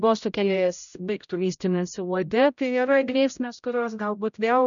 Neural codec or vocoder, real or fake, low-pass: codec, 16 kHz, 0.5 kbps, X-Codec, HuBERT features, trained on general audio; fake; 7.2 kHz